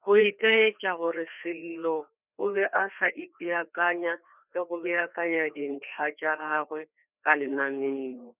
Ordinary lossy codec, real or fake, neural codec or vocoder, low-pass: none; fake; codec, 16 kHz, 2 kbps, FreqCodec, larger model; 3.6 kHz